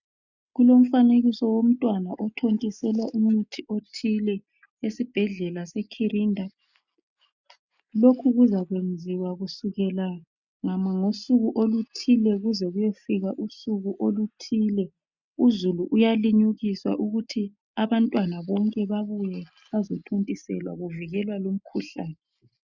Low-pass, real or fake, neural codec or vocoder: 7.2 kHz; real; none